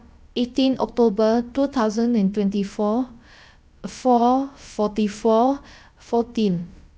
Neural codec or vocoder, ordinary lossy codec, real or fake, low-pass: codec, 16 kHz, about 1 kbps, DyCAST, with the encoder's durations; none; fake; none